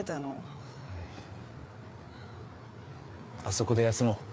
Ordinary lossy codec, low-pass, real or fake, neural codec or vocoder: none; none; fake; codec, 16 kHz, 4 kbps, FreqCodec, larger model